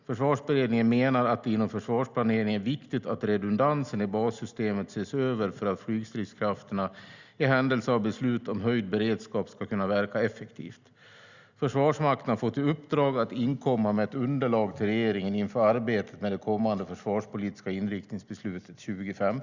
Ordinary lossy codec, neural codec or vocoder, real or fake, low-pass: Opus, 64 kbps; none; real; 7.2 kHz